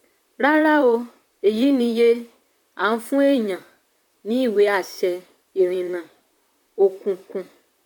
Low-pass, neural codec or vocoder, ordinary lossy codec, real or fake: 19.8 kHz; vocoder, 44.1 kHz, 128 mel bands, Pupu-Vocoder; none; fake